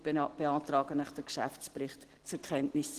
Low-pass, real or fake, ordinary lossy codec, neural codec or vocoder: 14.4 kHz; real; Opus, 16 kbps; none